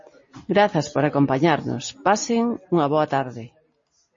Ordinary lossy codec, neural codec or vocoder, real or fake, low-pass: MP3, 32 kbps; none; real; 7.2 kHz